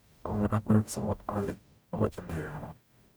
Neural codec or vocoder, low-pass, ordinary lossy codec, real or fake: codec, 44.1 kHz, 0.9 kbps, DAC; none; none; fake